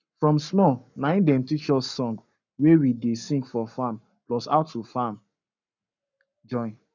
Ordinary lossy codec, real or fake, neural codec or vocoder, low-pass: none; fake; codec, 44.1 kHz, 7.8 kbps, Pupu-Codec; 7.2 kHz